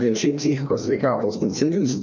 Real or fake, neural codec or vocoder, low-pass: fake; codec, 16 kHz, 1 kbps, FreqCodec, larger model; 7.2 kHz